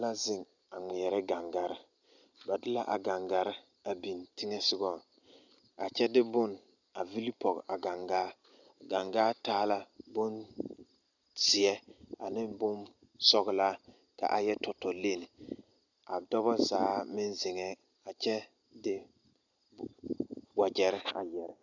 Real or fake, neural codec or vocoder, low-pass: real; none; 7.2 kHz